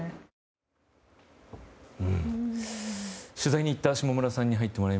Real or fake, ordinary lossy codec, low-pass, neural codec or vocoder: real; none; none; none